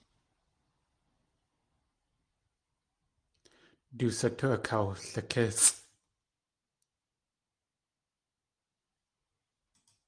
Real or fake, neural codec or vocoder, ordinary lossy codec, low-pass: real; none; Opus, 32 kbps; 9.9 kHz